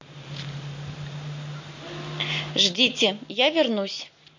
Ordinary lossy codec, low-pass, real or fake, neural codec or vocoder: MP3, 48 kbps; 7.2 kHz; real; none